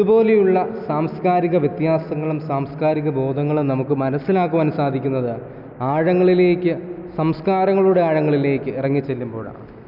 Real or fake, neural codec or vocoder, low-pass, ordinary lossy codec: real; none; 5.4 kHz; none